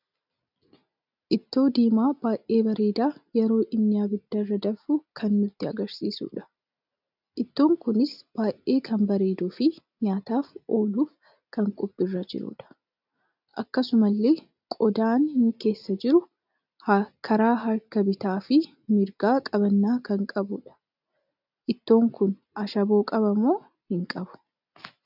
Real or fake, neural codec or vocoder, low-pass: real; none; 5.4 kHz